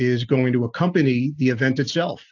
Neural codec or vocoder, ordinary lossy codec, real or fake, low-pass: none; AAC, 48 kbps; real; 7.2 kHz